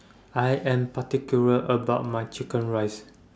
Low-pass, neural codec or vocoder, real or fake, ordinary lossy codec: none; none; real; none